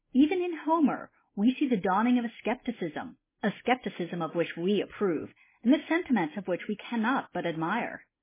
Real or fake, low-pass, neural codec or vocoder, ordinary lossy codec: real; 3.6 kHz; none; MP3, 16 kbps